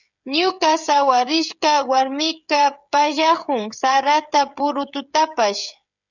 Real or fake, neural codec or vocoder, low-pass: fake; codec, 16 kHz, 16 kbps, FreqCodec, smaller model; 7.2 kHz